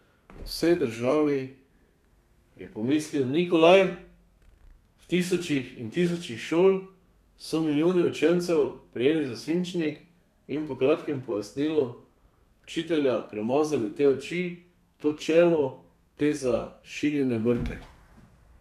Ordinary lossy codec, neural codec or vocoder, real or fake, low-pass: none; codec, 32 kHz, 1.9 kbps, SNAC; fake; 14.4 kHz